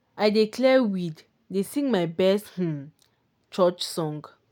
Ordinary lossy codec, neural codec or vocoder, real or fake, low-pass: none; none; real; 19.8 kHz